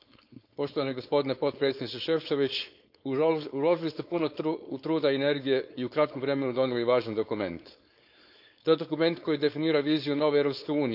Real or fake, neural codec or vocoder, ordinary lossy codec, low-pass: fake; codec, 16 kHz, 4.8 kbps, FACodec; none; 5.4 kHz